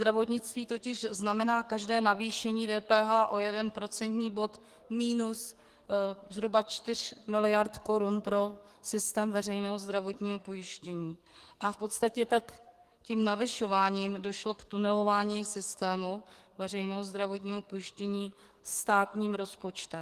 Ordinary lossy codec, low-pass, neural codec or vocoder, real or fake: Opus, 24 kbps; 14.4 kHz; codec, 44.1 kHz, 2.6 kbps, SNAC; fake